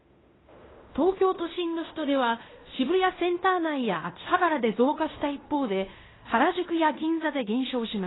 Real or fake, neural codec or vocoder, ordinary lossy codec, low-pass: fake; codec, 16 kHz in and 24 kHz out, 0.9 kbps, LongCat-Audio-Codec, fine tuned four codebook decoder; AAC, 16 kbps; 7.2 kHz